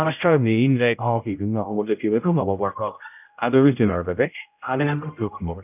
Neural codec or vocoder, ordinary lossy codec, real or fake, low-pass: codec, 16 kHz, 0.5 kbps, X-Codec, HuBERT features, trained on general audio; none; fake; 3.6 kHz